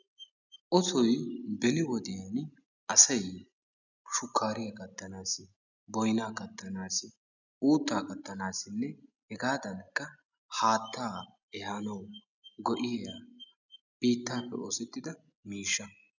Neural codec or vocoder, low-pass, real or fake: none; 7.2 kHz; real